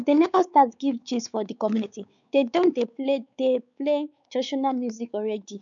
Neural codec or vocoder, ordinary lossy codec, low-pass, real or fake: codec, 16 kHz, 4 kbps, X-Codec, WavLM features, trained on Multilingual LibriSpeech; none; 7.2 kHz; fake